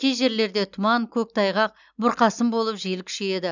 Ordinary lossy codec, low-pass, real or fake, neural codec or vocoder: none; 7.2 kHz; real; none